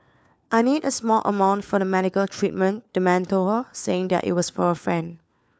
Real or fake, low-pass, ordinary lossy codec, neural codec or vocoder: fake; none; none; codec, 16 kHz, 4 kbps, FunCodec, trained on LibriTTS, 50 frames a second